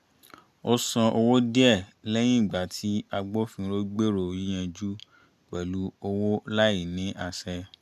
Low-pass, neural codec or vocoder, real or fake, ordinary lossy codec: 14.4 kHz; none; real; MP3, 96 kbps